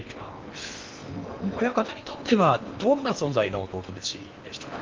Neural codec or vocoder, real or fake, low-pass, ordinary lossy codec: codec, 16 kHz in and 24 kHz out, 0.8 kbps, FocalCodec, streaming, 65536 codes; fake; 7.2 kHz; Opus, 24 kbps